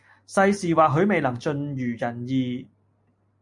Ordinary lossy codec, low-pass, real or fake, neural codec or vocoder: MP3, 48 kbps; 10.8 kHz; real; none